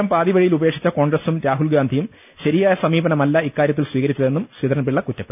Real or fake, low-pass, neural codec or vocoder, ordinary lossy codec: real; 3.6 kHz; none; none